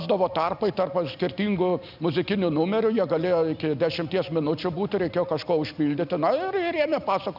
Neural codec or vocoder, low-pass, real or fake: none; 5.4 kHz; real